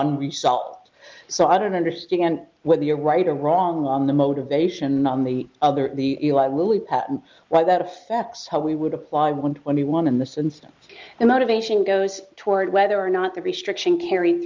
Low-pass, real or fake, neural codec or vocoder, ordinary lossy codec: 7.2 kHz; real; none; Opus, 24 kbps